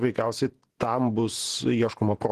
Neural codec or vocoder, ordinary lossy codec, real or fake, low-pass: vocoder, 48 kHz, 128 mel bands, Vocos; Opus, 16 kbps; fake; 14.4 kHz